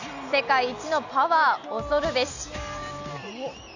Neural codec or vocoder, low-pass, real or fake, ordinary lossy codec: none; 7.2 kHz; real; none